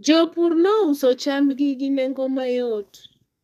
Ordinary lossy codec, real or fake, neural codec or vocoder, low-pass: none; fake; codec, 32 kHz, 1.9 kbps, SNAC; 14.4 kHz